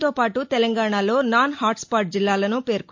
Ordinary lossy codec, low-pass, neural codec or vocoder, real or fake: MP3, 48 kbps; 7.2 kHz; none; real